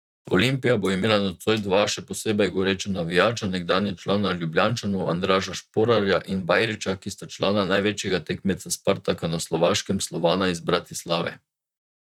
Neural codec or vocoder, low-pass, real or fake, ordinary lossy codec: vocoder, 44.1 kHz, 128 mel bands, Pupu-Vocoder; 19.8 kHz; fake; none